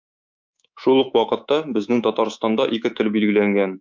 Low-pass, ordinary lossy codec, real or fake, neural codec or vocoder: 7.2 kHz; MP3, 64 kbps; fake; codec, 24 kHz, 3.1 kbps, DualCodec